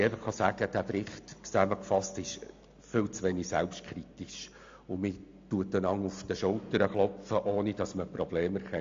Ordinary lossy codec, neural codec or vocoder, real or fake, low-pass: AAC, 48 kbps; none; real; 7.2 kHz